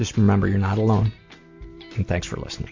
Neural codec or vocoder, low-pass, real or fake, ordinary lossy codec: none; 7.2 kHz; real; MP3, 48 kbps